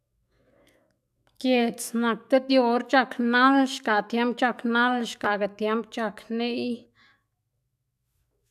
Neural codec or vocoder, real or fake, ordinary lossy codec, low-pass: autoencoder, 48 kHz, 128 numbers a frame, DAC-VAE, trained on Japanese speech; fake; none; 14.4 kHz